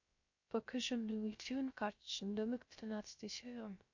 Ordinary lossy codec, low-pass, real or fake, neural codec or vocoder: MP3, 48 kbps; 7.2 kHz; fake; codec, 16 kHz, 0.3 kbps, FocalCodec